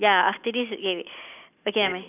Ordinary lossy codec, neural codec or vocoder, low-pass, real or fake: none; none; 3.6 kHz; real